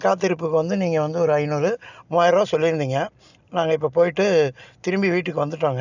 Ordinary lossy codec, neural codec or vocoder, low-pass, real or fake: none; none; 7.2 kHz; real